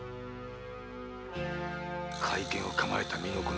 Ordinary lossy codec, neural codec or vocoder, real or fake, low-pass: none; none; real; none